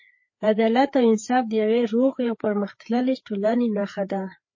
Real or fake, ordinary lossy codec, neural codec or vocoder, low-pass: fake; MP3, 32 kbps; codec, 16 kHz, 4 kbps, FreqCodec, larger model; 7.2 kHz